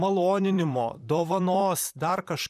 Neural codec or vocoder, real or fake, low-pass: vocoder, 44.1 kHz, 128 mel bands, Pupu-Vocoder; fake; 14.4 kHz